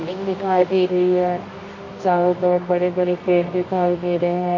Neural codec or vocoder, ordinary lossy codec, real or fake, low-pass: codec, 24 kHz, 0.9 kbps, WavTokenizer, medium music audio release; MP3, 32 kbps; fake; 7.2 kHz